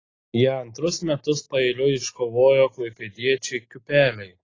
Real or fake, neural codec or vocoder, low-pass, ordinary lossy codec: real; none; 7.2 kHz; AAC, 32 kbps